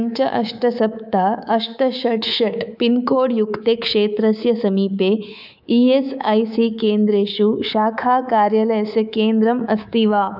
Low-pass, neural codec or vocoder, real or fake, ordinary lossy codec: 5.4 kHz; codec, 24 kHz, 3.1 kbps, DualCodec; fake; none